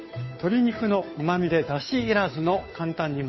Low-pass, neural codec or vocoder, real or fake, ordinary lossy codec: 7.2 kHz; codec, 16 kHz, 2 kbps, FunCodec, trained on Chinese and English, 25 frames a second; fake; MP3, 24 kbps